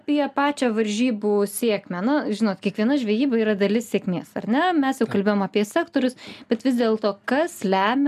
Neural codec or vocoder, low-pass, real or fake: none; 14.4 kHz; real